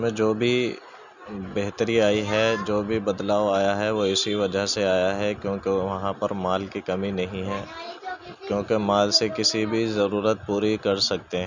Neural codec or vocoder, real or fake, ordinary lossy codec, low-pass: none; real; none; 7.2 kHz